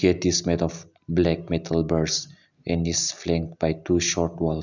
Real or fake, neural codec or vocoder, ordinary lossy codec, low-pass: real; none; none; 7.2 kHz